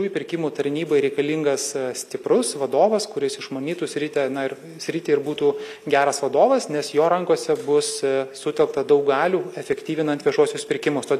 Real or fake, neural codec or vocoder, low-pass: real; none; 14.4 kHz